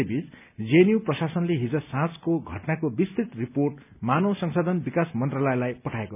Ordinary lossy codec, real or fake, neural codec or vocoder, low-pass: none; real; none; 3.6 kHz